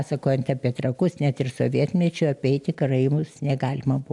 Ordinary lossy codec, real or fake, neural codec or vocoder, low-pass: MP3, 96 kbps; real; none; 10.8 kHz